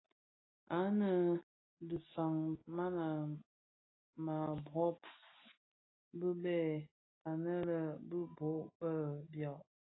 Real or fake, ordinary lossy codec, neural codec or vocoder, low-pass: real; AAC, 16 kbps; none; 7.2 kHz